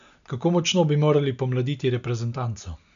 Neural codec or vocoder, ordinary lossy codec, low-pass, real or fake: none; none; 7.2 kHz; real